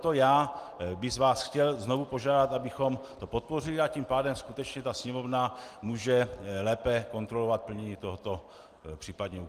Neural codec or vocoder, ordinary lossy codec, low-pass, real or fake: none; Opus, 24 kbps; 14.4 kHz; real